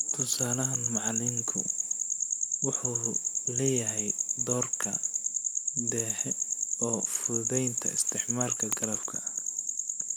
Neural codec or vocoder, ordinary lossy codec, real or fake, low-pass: none; none; real; none